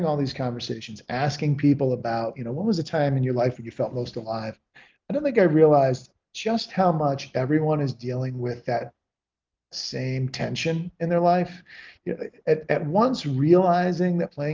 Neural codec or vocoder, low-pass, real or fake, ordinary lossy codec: none; 7.2 kHz; real; Opus, 24 kbps